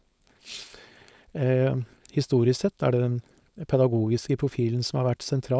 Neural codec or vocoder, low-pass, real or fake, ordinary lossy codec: codec, 16 kHz, 4.8 kbps, FACodec; none; fake; none